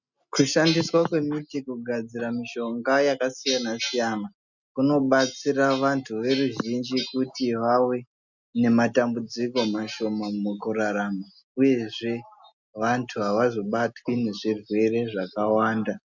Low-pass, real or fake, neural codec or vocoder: 7.2 kHz; real; none